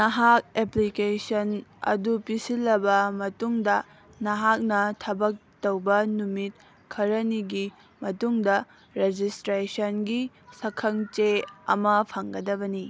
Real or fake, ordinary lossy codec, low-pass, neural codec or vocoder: real; none; none; none